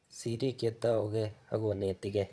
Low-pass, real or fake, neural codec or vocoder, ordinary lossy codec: none; fake; vocoder, 22.05 kHz, 80 mel bands, Vocos; none